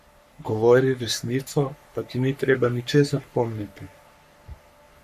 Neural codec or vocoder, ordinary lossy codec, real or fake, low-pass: codec, 44.1 kHz, 3.4 kbps, Pupu-Codec; AAC, 96 kbps; fake; 14.4 kHz